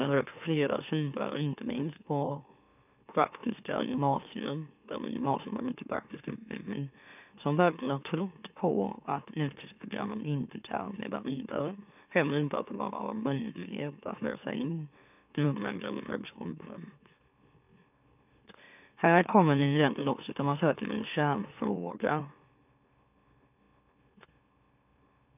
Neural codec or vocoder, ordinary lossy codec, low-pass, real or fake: autoencoder, 44.1 kHz, a latent of 192 numbers a frame, MeloTTS; none; 3.6 kHz; fake